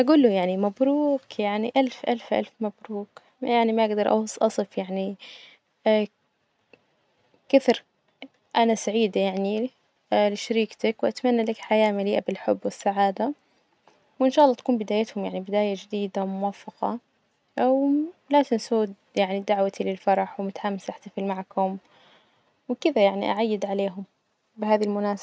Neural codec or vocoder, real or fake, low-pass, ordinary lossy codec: none; real; none; none